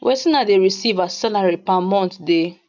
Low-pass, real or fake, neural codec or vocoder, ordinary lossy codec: 7.2 kHz; real; none; none